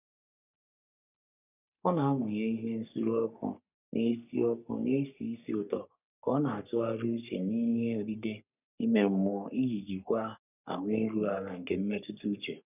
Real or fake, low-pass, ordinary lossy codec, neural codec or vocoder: fake; 3.6 kHz; none; codec, 44.1 kHz, 3.4 kbps, Pupu-Codec